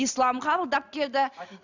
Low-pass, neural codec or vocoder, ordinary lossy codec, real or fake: 7.2 kHz; none; none; real